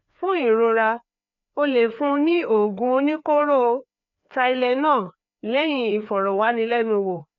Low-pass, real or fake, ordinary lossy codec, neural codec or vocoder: 7.2 kHz; fake; none; codec, 16 kHz, 2 kbps, FreqCodec, larger model